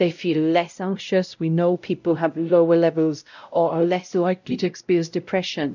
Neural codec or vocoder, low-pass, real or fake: codec, 16 kHz, 0.5 kbps, X-Codec, WavLM features, trained on Multilingual LibriSpeech; 7.2 kHz; fake